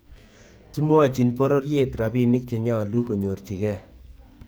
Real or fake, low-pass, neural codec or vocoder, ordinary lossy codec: fake; none; codec, 44.1 kHz, 2.6 kbps, SNAC; none